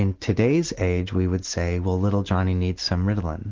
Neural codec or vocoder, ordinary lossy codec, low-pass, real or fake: none; Opus, 16 kbps; 7.2 kHz; real